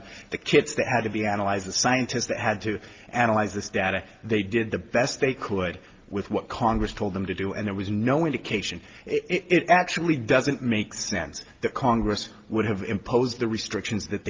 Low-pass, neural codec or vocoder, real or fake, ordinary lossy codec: 7.2 kHz; none; real; Opus, 32 kbps